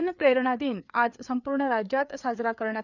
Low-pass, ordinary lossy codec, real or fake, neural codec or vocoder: 7.2 kHz; none; fake; codec, 16 kHz, 4 kbps, FreqCodec, larger model